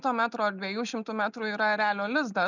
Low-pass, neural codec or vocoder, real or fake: 7.2 kHz; none; real